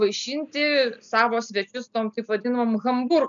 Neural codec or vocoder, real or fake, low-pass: none; real; 7.2 kHz